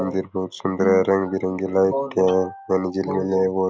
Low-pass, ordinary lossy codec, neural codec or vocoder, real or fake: none; none; none; real